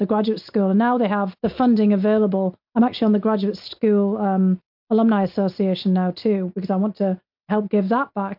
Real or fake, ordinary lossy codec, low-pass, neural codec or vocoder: real; MP3, 48 kbps; 5.4 kHz; none